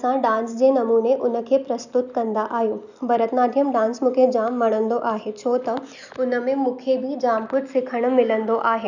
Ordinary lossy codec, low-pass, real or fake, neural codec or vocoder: none; 7.2 kHz; real; none